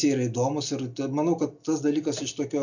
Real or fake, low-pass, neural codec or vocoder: real; 7.2 kHz; none